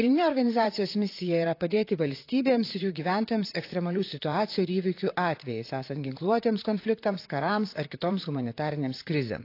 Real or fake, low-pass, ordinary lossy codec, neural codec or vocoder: fake; 5.4 kHz; AAC, 32 kbps; vocoder, 44.1 kHz, 128 mel bands, Pupu-Vocoder